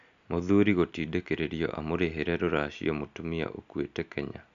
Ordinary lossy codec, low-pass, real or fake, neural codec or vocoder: AAC, 96 kbps; 7.2 kHz; real; none